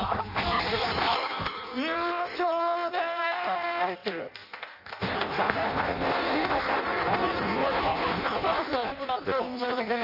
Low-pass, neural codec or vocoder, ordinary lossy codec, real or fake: 5.4 kHz; codec, 16 kHz in and 24 kHz out, 0.6 kbps, FireRedTTS-2 codec; none; fake